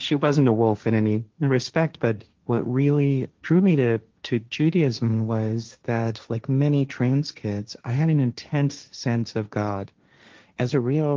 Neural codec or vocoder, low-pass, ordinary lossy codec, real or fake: codec, 16 kHz, 1.1 kbps, Voila-Tokenizer; 7.2 kHz; Opus, 32 kbps; fake